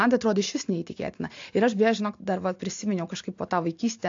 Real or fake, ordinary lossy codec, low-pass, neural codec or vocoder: real; AAC, 48 kbps; 7.2 kHz; none